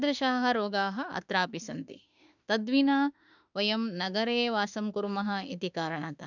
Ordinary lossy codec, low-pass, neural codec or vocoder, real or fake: none; 7.2 kHz; autoencoder, 48 kHz, 32 numbers a frame, DAC-VAE, trained on Japanese speech; fake